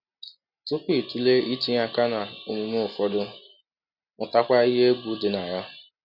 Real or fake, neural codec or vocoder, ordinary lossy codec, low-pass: real; none; none; 5.4 kHz